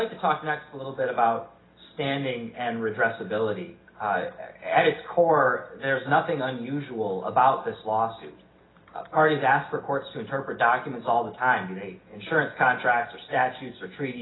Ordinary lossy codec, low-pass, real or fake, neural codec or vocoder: AAC, 16 kbps; 7.2 kHz; real; none